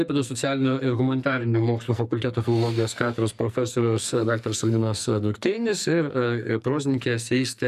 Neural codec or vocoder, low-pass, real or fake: codec, 44.1 kHz, 2.6 kbps, SNAC; 14.4 kHz; fake